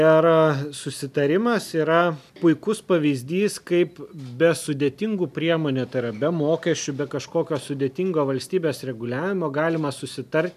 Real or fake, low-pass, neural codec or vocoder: real; 14.4 kHz; none